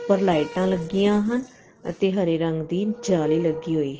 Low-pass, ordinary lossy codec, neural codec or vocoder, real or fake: 7.2 kHz; Opus, 16 kbps; none; real